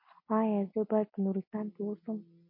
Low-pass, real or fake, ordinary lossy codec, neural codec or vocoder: 5.4 kHz; real; MP3, 32 kbps; none